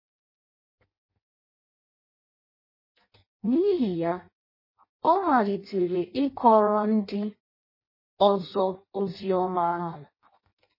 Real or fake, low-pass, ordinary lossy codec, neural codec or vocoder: fake; 5.4 kHz; MP3, 24 kbps; codec, 16 kHz in and 24 kHz out, 0.6 kbps, FireRedTTS-2 codec